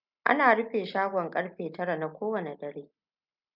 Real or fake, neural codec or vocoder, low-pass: real; none; 5.4 kHz